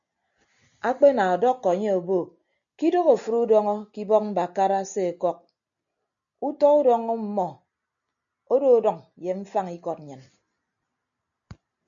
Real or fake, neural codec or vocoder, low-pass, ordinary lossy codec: real; none; 7.2 kHz; MP3, 64 kbps